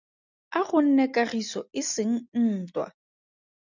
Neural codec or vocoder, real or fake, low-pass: none; real; 7.2 kHz